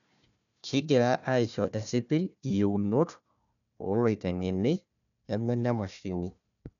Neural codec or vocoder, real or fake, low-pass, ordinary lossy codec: codec, 16 kHz, 1 kbps, FunCodec, trained on Chinese and English, 50 frames a second; fake; 7.2 kHz; none